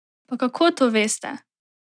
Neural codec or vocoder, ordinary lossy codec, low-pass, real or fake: none; none; 9.9 kHz; real